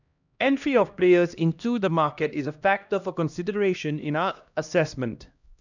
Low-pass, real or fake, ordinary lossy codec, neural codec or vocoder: 7.2 kHz; fake; none; codec, 16 kHz, 1 kbps, X-Codec, HuBERT features, trained on LibriSpeech